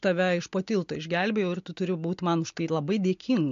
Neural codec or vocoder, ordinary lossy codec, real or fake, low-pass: none; MP3, 48 kbps; real; 7.2 kHz